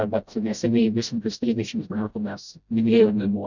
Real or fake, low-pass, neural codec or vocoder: fake; 7.2 kHz; codec, 16 kHz, 0.5 kbps, FreqCodec, smaller model